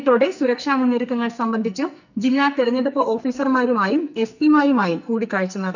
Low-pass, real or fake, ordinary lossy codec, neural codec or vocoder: 7.2 kHz; fake; none; codec, 44.1 kHz, 2.6 kbps, SNAC